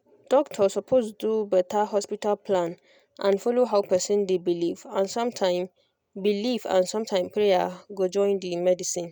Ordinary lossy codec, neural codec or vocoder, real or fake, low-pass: none; none; real; 19.8 kHz